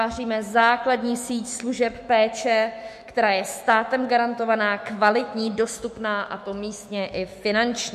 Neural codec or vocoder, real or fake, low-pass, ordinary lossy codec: autoencoder, 48 kHz, 128 numbers a frame, DAC-VAE, trained on Japanese speech; fake; 14.4 kHz; MP3, 64 kbps